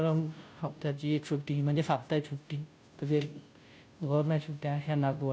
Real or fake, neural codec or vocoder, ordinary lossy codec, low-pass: fake; codec, 16 kHz, 0.5 kbps, FunCodec, trained on Chinese and English, 25 frames a second; none; none